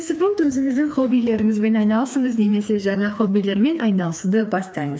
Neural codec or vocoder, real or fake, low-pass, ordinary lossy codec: codec, 16 kHz, 2 kbps, FreqCodec, larger model; fake; none; none